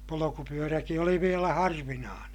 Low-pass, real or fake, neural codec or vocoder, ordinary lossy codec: 19.8 kHz; real; none; none